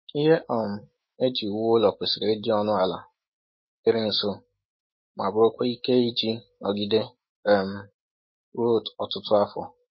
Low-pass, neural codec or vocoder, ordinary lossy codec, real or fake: 7.2 kHz; autoencoder, 48 kHz, 128 numbers a frame, DAC-VAE, trained on Japanese speech; MP3, 24 kbps; fake